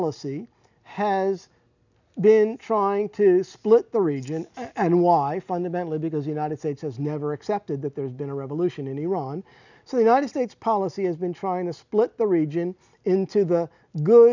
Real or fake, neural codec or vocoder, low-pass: real; none; 7.2 kHz